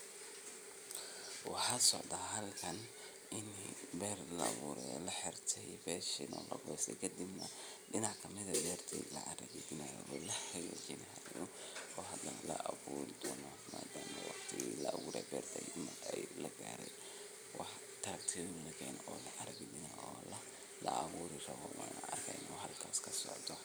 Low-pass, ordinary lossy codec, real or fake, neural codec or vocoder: none; none; fake; vocoder, 44.1 kHz, 128 mel bands every 256 samples, BigVGAN v2